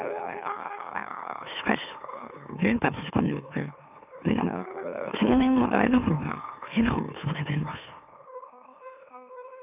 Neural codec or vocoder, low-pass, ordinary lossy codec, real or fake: autoencoder, 44.1 kHz, a latent of 192 numbers a frame, MeloTTS; 3.6 kHz; none; fake